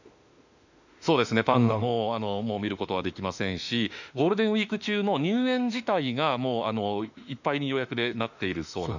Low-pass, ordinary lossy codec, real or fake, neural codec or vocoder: 7.2 kHz; none; fake; autoencoder, 48 kHz, 32 numbers a frame, DAC-VAE, trained on Japanese speech